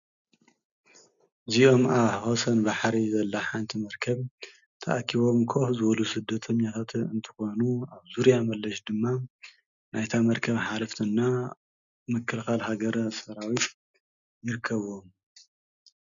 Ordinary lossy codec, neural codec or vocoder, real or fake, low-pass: MP3, 96 kbps; none; real; 7.2 kHz